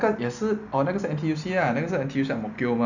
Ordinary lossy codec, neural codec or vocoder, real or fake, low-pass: none; none; real; 7.2 kHz